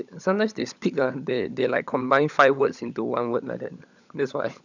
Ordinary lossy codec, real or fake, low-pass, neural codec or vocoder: none; fake; 7.2 kHz; codec, 16 kHz, 16 kbps, FunCodec, trained on LibriTTS, 50 frames a second